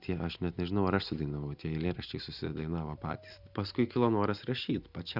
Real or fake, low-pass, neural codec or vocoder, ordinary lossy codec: real; 5.4 kHz; none; Opus, 64 kbps